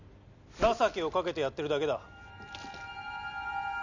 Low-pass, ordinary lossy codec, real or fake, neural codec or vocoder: 7.2 kHz; none; real; none